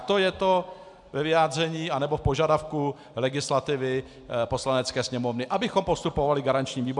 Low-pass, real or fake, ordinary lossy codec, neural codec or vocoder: 10.8 kHz; real; MP3, 96 kbps; none